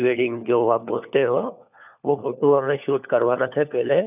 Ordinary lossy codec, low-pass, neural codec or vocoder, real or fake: none; 3.6 kHz; codec, 16 kHz, 4 kbps, FunCodec, trained on LibriTTS, 50 frames a second; fake